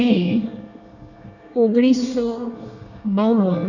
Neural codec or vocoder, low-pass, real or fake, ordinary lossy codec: codec, 24 kHz, 1 kbps, SNAC; 7.2 kHz; fake; none